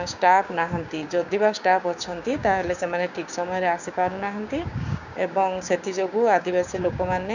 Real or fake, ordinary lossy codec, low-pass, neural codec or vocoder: fake; none; 7.2 kHz; codec, 16 kHz, 6 kbps, DAC